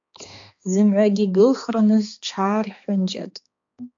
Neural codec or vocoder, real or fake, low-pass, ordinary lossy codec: codec, 16 kHz, 2 kbps, X-Codec, HuBERT features, trained on balanced general audio; fake; 7.2 kHz; AAC, 64 kbps